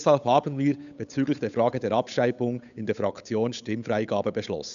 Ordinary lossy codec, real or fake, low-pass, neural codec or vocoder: none; fake; 7.2 kHz; codec, 16 kHz, 8 kbps, FunCodec, trained on Chinese and English, 25 frames a second